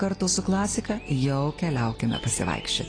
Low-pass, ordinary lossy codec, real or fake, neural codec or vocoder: 9.9 kHz; AAC, 32 kbps; real; none